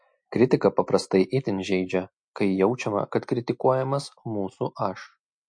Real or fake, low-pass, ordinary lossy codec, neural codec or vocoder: real; 9.9 kHz; MP3, 48 kbps; none